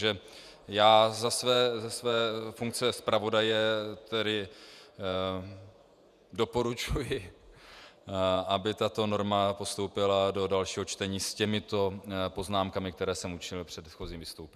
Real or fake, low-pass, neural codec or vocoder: fake; 14.4 kHz; vocoder, 48 kHz, 128 mel bands, Vocos